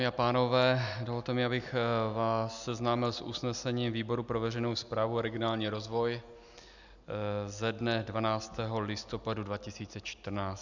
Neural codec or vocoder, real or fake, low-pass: none; real; 7.2 kHz